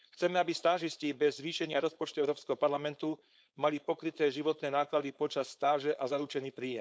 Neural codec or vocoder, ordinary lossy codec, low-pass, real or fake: codec, 16 kHz, 4.8 kbps, FACodec; none; none; fake